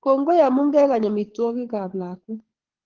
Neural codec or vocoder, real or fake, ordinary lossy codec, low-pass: codec, 24 kHz, 6 kbps, HILCodec; fake; Opus, 16 kbps; 7.2 kHz